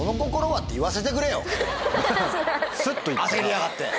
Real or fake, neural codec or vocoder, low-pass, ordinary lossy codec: real; none; none; none